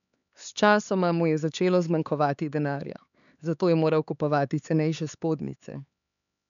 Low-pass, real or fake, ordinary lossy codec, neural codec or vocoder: 7.2 kHz; fake; none; codec, 16 kHz, 4 kbps, X-Codec, HuBERT features, trained on LibriSpeech